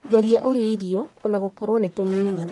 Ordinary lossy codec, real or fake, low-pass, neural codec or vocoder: none; fake; 10.8 kHz; codec, 44.1 kHz, 1.7 kbps, Pupu-Codec